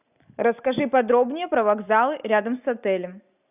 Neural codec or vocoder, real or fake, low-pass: none; real; 3.6 kHz